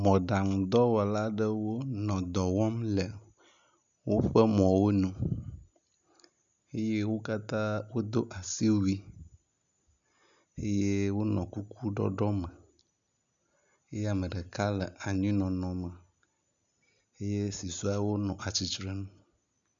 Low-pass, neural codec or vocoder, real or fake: 7.2 kHz; none; real